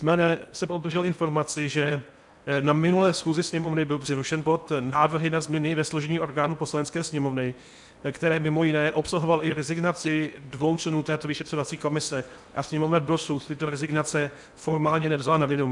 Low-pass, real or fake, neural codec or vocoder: 10.8 kHz; fake; codec, 16 kHz in and 24 kHz out, 0.8 kbps, FocalCodec, streaming, 65536 codes